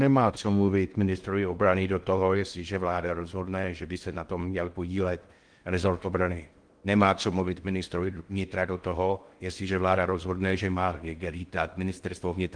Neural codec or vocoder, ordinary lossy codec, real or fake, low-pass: codec, 16 kHz in and 24 kHz out, 0.6 kbps, FocalCodec, streaming, 2048 codes; Opus, 24 kbps; fake; 9.9 kHz